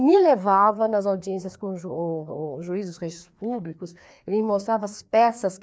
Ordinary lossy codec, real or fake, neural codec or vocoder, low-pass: none; fake; codec, 16 kHz, 2 kbps, FreqCodec, larger model; none